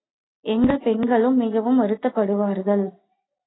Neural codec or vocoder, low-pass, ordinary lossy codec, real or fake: none; 7.2 kHz; AAC, 16 kbps; real